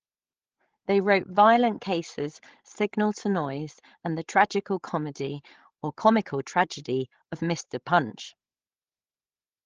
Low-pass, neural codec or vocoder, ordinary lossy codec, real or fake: 7.2 kHz; codec, 16 kHz, 16 kbps, FreqCodec, larger model; Opus, 16 kbps; fake